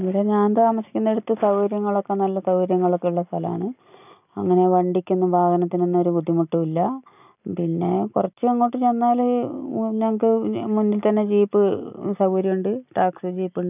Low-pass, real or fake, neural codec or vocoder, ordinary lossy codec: 3.6 kHz; real; none; none